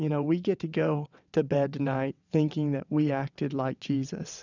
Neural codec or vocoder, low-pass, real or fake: vocoder, 22.05 kHz, 80 mel bands, WaveNeXt; 7.2 kHz; fake